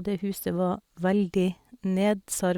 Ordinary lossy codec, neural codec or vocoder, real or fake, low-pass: Opus, 64 kbps; none; real; 19.8 kHz